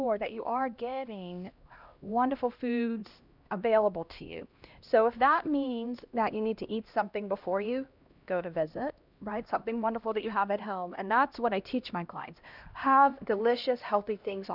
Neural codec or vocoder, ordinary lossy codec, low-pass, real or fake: codec, 16 kHz, 1 kbps, X-Codec, HuBERT features, trained on LibriSpeech; Opus, 64 kbps; 5.4 kHz; fake